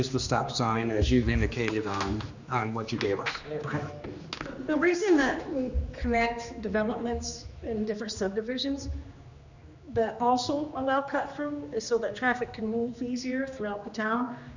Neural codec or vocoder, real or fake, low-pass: codec, 16 kHz, 2 kbps, X-Codec, HuBERT features, trained on general audio; fake; 7.2 kHz